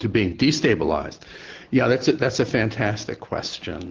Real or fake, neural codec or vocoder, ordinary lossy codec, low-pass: real; none; Opus, 16 kbps; 7.2 kHz